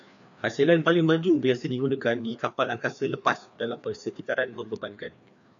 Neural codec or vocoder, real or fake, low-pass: codec, 16 kHz, 2 kbps, FreqCodec, larger model; fake; 7.2 kHz